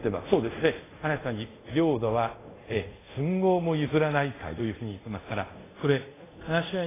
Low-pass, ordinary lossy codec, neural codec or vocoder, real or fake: 3.6 kHz; AAC, 16 kbps; codec, 24 kHz, 0.5 kbps, DualCodec; fake